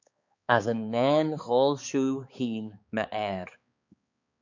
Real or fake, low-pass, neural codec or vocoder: fake; 7.2 kHz; codec, 16 kHz, 4 kbps, X-Codec, HuBERT features, trained on balanced general audio